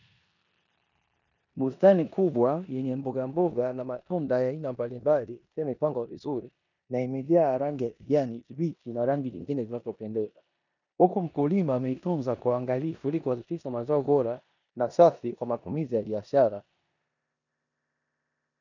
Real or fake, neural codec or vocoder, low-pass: fake; codec, 16 kHz in and 24 kHz out, 0.9 kbps, LongCat-Audio-Codec, four codebook decoder; 7.2 kHz